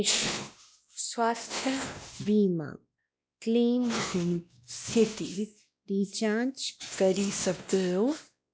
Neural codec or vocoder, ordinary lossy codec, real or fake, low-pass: codec, 16 kHz, 1 kbps, X-Codec, WavLM features, trained on Multilingual LibriSpeech; none; fake; none